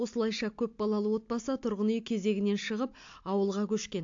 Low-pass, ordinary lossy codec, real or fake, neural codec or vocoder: 7.2 kHz; AAC, 48 kbps; real; none